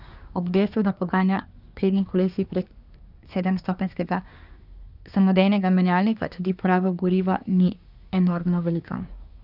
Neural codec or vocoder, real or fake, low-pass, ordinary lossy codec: codec, 24 kHz, 1 kbps, SNAC; fake; 5.4 kHz; none